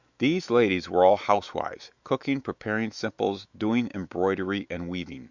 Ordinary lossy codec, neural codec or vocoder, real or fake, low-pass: Opus, 64 kbps; autoencoder, 48 kHz, 128 numbers a frame, DAC-VAE, trained on Japanese speech; fake; 7.2 kHz